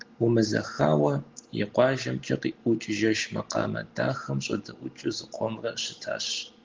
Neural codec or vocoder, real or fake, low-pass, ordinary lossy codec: none; real; 7.2 kHz; Opus, 16 kbps